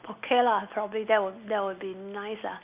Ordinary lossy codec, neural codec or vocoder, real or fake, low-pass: Opus, 64 kbps; none; real; 3.6 kHz